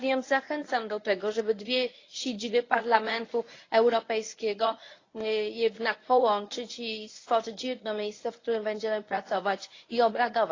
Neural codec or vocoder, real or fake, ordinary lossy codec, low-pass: codec, 24 kHz, 0.9 kbps, WavTokenizer, medium speech release version 1; fake; AAC, 32 kbps; 7.2 kHz